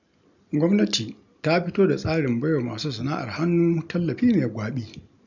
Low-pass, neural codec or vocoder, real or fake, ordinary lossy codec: 7.2 kHz; none; real; none